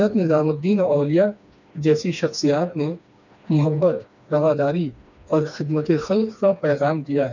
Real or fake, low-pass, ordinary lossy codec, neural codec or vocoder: fake; 7.2 kHz; none; codec, 16 kHz, 2 kbps, FreqCodec, smaller model